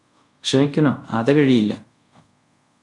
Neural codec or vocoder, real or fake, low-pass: codec, 24 kHz, 0.5 kbps, DualCodec; fake; 10.8 kHz